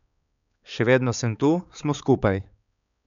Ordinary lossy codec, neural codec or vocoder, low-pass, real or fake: none; codec, 16 kHz, 4 kbps, X-Codec, HuBERT features, trained on balanced general audio; 7.2 kHz; fake